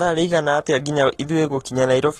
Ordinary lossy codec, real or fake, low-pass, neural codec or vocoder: AAC, 32 kbps; fake; 19.8 kHz; codec, 44.1 kHz, 7.8 kbps, Pupu-Codec